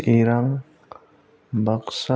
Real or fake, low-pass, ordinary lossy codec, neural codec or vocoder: real; none; none; none